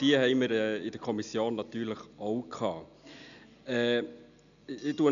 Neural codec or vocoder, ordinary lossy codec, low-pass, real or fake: none; none; 7.2 kHz; real